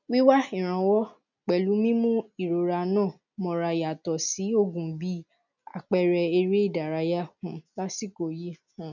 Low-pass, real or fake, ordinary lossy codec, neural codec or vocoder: 7.2 kHz; real; none; none